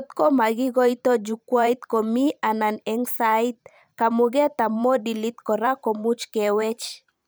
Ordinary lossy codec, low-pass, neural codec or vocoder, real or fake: none; none; vocoder, 44.1 kHz, 128 mel bands every 512 samples, BigVGAN v2; fake